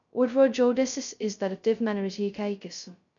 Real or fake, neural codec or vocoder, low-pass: fake; codec, 16 kHz, 0.2 kbps, FocalCodec; 7.2 kHz